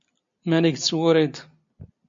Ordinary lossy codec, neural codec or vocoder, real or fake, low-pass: MP3, 48 kbps; none; real; 7.2 kHz